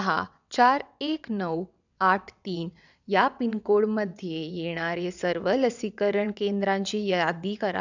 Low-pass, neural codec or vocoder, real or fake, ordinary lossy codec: 7.2 kHz; vocoder, 22.05 kHz, 80 mel bands, WaveNeXt; fake; none